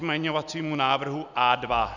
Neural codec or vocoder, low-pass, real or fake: none; 7.2 kHz; real